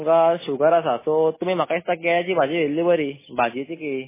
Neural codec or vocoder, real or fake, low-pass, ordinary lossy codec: none; real; 3.6 kHz; MP3, 16 kbps